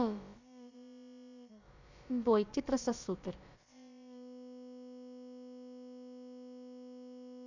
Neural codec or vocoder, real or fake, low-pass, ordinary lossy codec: codec, 16 kHz, about 1 kbps, DyCAST, with the encoder's durations; fake; 7.2 kHz; Opus, 64 kbps